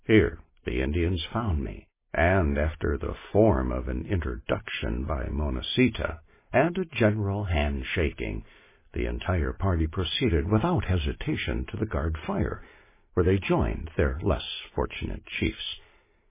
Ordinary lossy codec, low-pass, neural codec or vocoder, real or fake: MP3, 16 kbps; 3.6 kHz; none; real